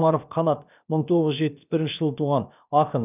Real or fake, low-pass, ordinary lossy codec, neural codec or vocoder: fake; 3.6 kHz; none; codec, 16 kHz, about 1 kbps, DyCAST, with the encoder's durations